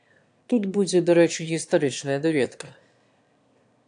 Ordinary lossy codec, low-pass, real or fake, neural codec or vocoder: AAC, 64 kbps; 9.9 kHz; fake; autoencoder, 22.05 kHz, a latent of 192 numbers a frame, VITS, trained on one speaker